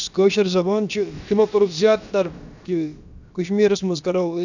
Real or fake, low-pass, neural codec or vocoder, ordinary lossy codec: fake; 7.2 kHz; codec, 16 kHz, about 1 kbps, DyCAST, with the encoder's durations; none